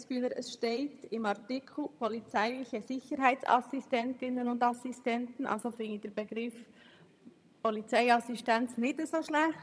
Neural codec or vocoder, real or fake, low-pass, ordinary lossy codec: vocoder, 22.05 kHz, 80 mel bands, HiFi-GAN; fake; none; none